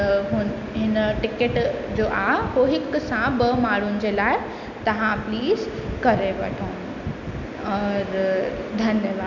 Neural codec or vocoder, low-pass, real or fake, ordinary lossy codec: none; 7.2 kHz; real; none